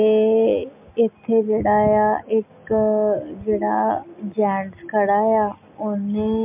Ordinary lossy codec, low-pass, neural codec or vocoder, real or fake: MP3, 32 kbps; 3.6 kHz; none; real